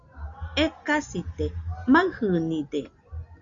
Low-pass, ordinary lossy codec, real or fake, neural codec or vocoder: 7.2 kHz; Opus, 64 kbps; real; none